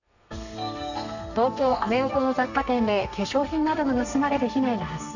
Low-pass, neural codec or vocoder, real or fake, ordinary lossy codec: 7.2 kHz; codec, 32 kHz, 1.9 kbps, SNAC; fake; none